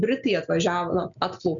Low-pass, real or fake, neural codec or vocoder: 7.2 kHz; real; none